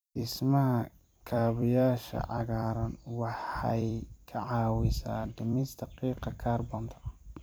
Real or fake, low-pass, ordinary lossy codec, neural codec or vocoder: fake; none; none; vocoder, 44.1 kHz, 128 mel bands every 256 samples, BigVGAN v2